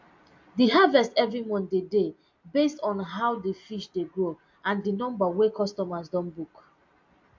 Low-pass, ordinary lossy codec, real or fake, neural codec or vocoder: 7.2 kHz; MP3, 48 kbps; real; none